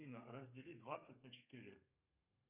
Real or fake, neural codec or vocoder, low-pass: fake; codec, 32 kHz, 1.9 kbps, SNAC; 3.6 kHz